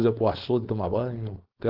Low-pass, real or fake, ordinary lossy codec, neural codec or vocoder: 5.4 kHz; fake; Opus, 16 kbps; codec, 16 kHz, 4.8 kbps, FACodec